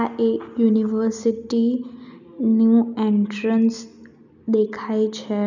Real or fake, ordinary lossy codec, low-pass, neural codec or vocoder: real; none; 7.2 kHz; none